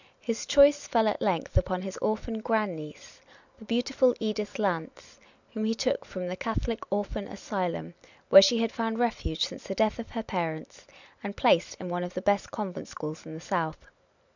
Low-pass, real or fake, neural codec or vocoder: 7.2 kHz; real; none